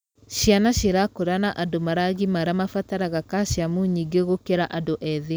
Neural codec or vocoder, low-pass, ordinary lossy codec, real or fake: none; none; none; real